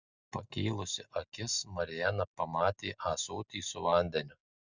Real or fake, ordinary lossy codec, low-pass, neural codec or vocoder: real; Opus, 64 kbps; 7.2 kHz; none